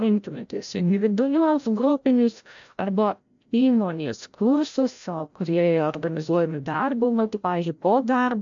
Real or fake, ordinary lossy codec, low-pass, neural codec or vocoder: fake; AAC, 64 kbps; 7.2 kHz; codec, 16 kHz, 0.5 kbps, FreqCodec, larger model